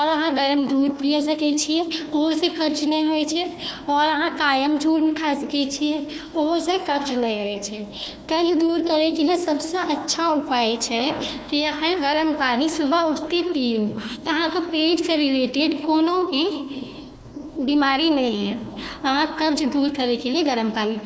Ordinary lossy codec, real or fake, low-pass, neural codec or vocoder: none; fake; none; codec, 16 kHz, 1 kbps, FunCodec, trained on Chinese and English, 50 frames a second